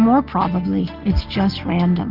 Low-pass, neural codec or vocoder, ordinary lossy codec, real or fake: 5.4 kHz; none; Opus, 16 kbps; real